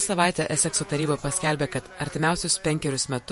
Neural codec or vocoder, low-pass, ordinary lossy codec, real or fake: vocoder, 48 kHz, 128 mel bands, Vocos; 14.4 kHz; MP3, 48 kbps; fake